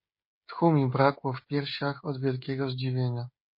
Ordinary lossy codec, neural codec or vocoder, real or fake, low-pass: MP3, 32 kbps; codec, 16 kHz, 16 kbps, FreqCodec, smaller model; fake; 5.4 kHz